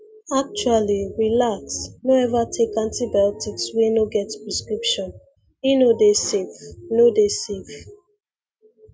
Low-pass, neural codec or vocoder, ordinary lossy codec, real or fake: none; none; none; real